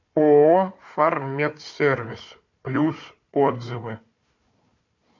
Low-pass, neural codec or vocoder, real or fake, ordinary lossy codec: 7.2 kHz; codec, 16 kHz, 4 kbps, FunCodec, trained on Chinese and English, 50 frames a second; fake; MP3, 48 kbps